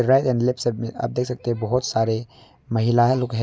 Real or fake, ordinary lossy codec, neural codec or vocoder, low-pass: real; none; none; none